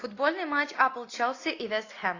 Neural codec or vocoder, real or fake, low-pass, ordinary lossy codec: none; real; 7.2 kHz; AAC, 32 kbps